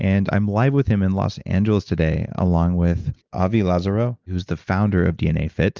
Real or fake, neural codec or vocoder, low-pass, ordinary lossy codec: real; none; 7.2 kHz; Opus, 32 kbps